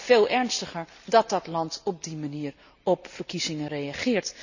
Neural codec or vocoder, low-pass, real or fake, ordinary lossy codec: none; 7.2 kHz; real; none